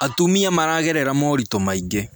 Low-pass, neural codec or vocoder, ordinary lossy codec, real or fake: none; none; none; real